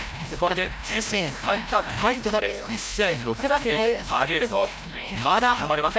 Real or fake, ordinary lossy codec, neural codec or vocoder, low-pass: fake; none; codec, 16 kHz, 0.5 kbps, FreqCodec, larger model; none